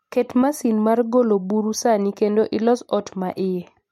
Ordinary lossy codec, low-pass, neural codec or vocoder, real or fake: MP3, 64 kbps; 19.8 kHz; none; real